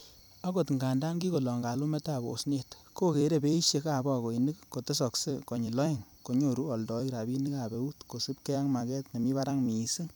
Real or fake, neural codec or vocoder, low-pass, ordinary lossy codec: fake; vocoder, 44.1 kHz, 128 mel bands every 256 samples, BigVGAN v2; none; none